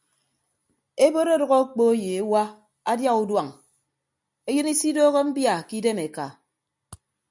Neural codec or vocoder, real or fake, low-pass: none; real; 10.8 kHz